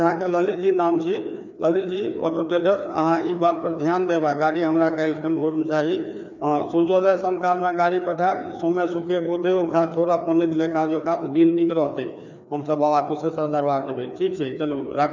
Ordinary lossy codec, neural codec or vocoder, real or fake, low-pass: none; codec, 16 kHz, 2 kbps, FreqCodec, larger model; fake; 7.2 kHz